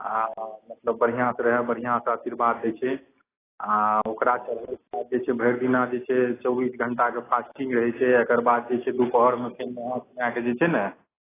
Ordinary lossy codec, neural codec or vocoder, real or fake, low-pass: AAC, 16 kbps; none; real; 3.6 kHz